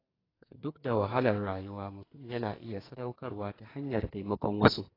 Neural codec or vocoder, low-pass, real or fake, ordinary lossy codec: codec, 44.1 kHz, 2.6 kbps, SNAC; 5.4 kHz; fake; AAC, 24 kbps